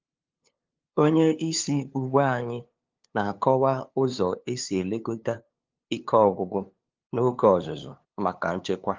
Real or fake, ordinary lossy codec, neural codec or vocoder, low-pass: fake; Opus, 32 kbps; codec, 16 kHz, 2 kbps, FunCodec, trained on LibriTTS, 25 frames a second; 7.2 kHz